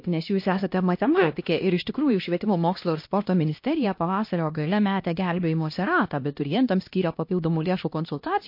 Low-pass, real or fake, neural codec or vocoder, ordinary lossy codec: 5.4 kHz; fake; codec, 16 kHz, 1 kbps, X-Codec, HuBERT features, trained on LibriSpeech; MP3, 32 kbps